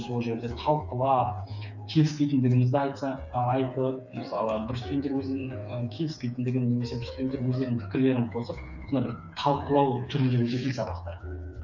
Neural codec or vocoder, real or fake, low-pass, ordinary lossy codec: codec, 16 kHz, 4 kbps, FreqCodec, smaller model; fake; 7.2 kHz; none